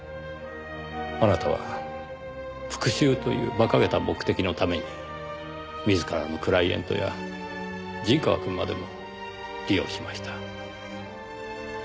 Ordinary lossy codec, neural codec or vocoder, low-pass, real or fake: none; none; none; real